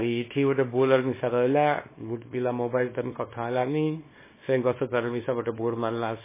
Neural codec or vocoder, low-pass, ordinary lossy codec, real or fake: codec, 24 kHz, 0.9 kbps, WavTokenizer, small release; 3.6 kHz; MP3, 16 kbps; fake